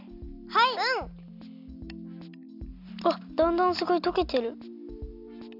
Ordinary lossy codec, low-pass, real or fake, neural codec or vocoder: none; 5.4 kHz; real; none